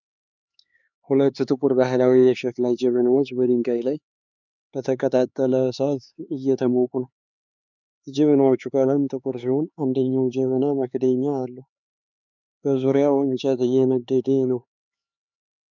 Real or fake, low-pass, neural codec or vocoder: fake; 7.2 kHz; codec, 16 kHz, 4 kbps, X-Codec, HuBERT features, trained on LibriSpeech